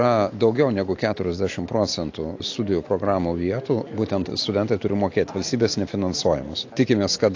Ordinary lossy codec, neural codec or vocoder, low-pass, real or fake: AAC, 48 kbps; none; 7.2 kHz; real